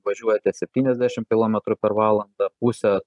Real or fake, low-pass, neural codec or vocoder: real; 10.8 kHz; none